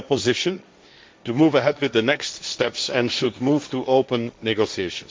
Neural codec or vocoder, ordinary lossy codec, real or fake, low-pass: codec, 16 kHz, 1.1 kbps, Voila-Tokenizer; none; fake; none